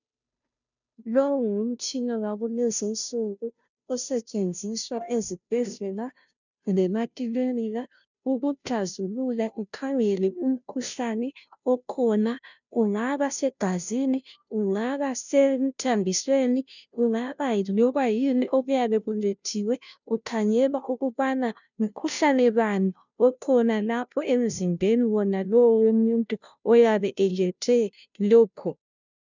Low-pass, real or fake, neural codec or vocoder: 7.2 kHz; fake; codec, 16 kHz, 0.5 kbps, FunCodec, trained on Chinese and English, 25 frames a second